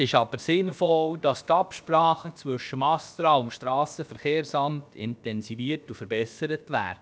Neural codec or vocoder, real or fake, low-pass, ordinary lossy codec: codec, 16 kHz, about 1 kbps, DyCAST, with the encoder's durations; fake; none; none